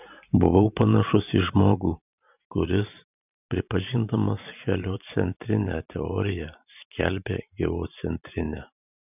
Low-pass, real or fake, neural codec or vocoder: 3.6 kHz; fake; vocoder, 44.1 kHz, 128 mel bands every 512 samples, BigVGAN v2